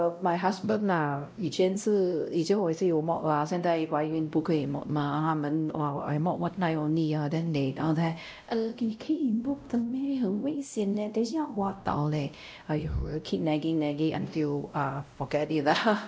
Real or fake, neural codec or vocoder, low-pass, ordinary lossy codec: fake; codec, 16 kHz, 0.5 kbps, X-Codec, WavLM features, trained on Multilingual LibriSpeech; none; none